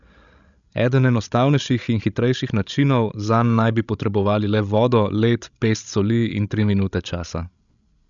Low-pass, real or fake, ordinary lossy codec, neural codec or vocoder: 7.2 kHz; fake; none; codec, 16 kHz, 16 kbps, FreqCodec, larger model